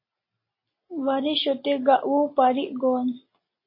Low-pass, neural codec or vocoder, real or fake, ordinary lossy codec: 5.4 kHz; none; real; MP3, 24 kbps